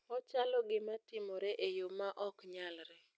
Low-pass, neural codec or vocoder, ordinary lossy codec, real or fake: none; none; none; real